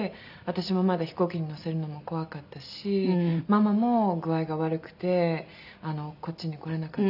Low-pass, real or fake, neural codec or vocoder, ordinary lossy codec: 5.4 kHz; real; none; none